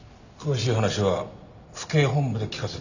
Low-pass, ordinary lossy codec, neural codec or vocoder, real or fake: 7.2 kHz; none; none; real